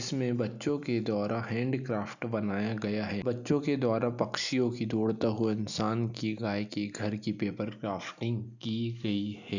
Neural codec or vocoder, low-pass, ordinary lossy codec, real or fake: none; 7.2 kHz; none; real